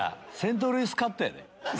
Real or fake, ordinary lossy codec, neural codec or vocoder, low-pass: real; none; none; none